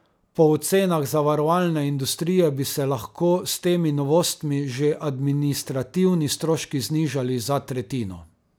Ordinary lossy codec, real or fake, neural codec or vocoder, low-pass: none; real; none; none